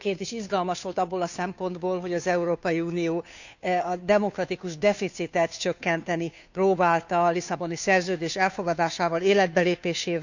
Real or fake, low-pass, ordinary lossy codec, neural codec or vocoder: fake; 7.2 kHz; none; codec, 16 kHz, 2 kbps, FunCodec, trained on Chinese and English, 25 frames a second